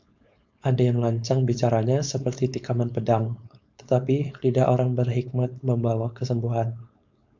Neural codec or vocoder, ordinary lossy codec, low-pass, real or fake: codec, 16 kHz, 4.8 kbps, FACodec; MP3, 64 kbps; 7.2 kHz; fake